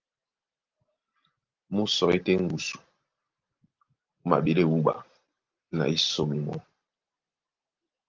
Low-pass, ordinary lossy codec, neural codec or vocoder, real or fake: 7.2 kHz; Opus, 32 kbps; none; real